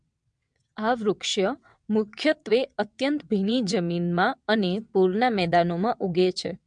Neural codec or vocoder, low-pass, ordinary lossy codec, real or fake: vocoder, 22.05 kHz, 80 mel bands, WaveNeXt; 9.9 kHz; MP3, 64 kbps; fake